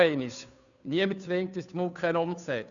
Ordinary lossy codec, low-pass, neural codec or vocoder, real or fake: none; 7.2 kHz; codec, 16 kHz, 2 kbps, FunCodec, trained on Chinese and English, 25 frames a second; fake